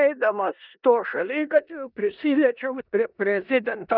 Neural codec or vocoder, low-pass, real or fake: codec, 16 kHz in and 24 kHz out, 0.9 kbps, LongCat-Audio-Codec, four codebook decoder; 5.4 kHz; fake